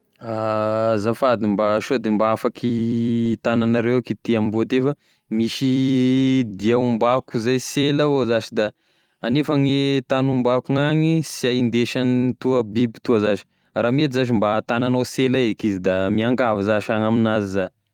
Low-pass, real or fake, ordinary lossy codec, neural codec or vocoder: 19.8 kHz; fake; Opus, 32 kbps; vocoder, 44.1 kHz, 128 mel bands every 256 samples, BigVGAN v2